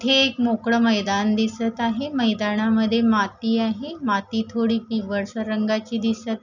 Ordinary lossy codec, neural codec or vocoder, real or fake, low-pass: none; none; real; 7.2 kHz